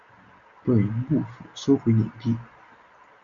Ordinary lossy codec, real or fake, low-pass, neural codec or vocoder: Opus, 64 kbps; real; 7.2 kHz; none